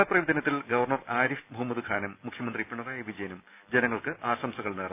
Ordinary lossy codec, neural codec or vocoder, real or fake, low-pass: none; none; real; 3.6 kHz